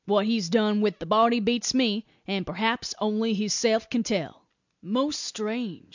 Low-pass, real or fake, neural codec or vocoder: 7.2 kHz; real; none